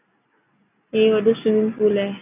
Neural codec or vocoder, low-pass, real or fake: none; 3.6 kHz; real